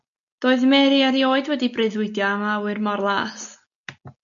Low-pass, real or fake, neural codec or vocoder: 7.2 kHz; real; none